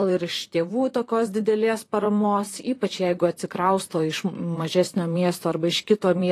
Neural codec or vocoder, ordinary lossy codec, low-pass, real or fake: vocoder, 44.1 kHz, 128 mel bands, Pupu-Vocoder; AAC, 48 kbps; 14.4 kHz; fake